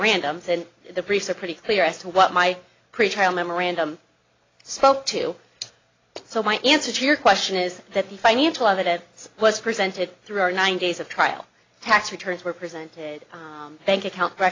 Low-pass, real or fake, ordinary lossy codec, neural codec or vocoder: 7.2 kHz; real; AAC, 32 kbps; none